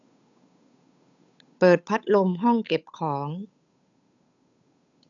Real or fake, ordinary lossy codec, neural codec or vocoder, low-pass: fake; none; codec, 16 kHz, 8 kbps, FunCodec, trained on Chinese and English, 25 frames a second; 7.2 kHz